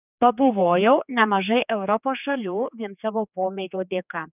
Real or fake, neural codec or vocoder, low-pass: fake; codec, 16 kHz, 4 kbps, X-Codec, HuBERT features, trained on general audio; 3.6 kHz